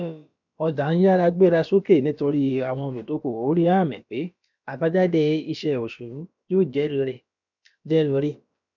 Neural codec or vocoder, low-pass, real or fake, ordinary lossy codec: codec, 16 kHz, about 1 kbps, DyCAST, with the encoder's durations; 7.2 kHz; fake; none